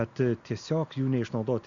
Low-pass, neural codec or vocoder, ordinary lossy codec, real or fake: 7.2 kHz; none; Opus, 64 kbps; real